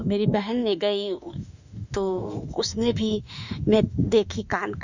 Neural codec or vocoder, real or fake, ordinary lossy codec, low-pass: autoencoder, 48 kHz, 32 numbers a frame, DAC-VAE, trained on Japanese speech; fake; none; 7.2 kHz